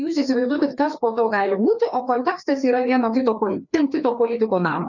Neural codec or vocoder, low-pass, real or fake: codec, 16 kHz, 2 kbps, FreqCodec, larger model; 7.2 kHz; fake